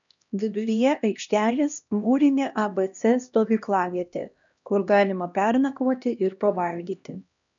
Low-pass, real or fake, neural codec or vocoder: 7.2 kHz; fake; codec, 16 kHz, 1 kbps, X-Codec, HuBERT features, trained on LibriSpeech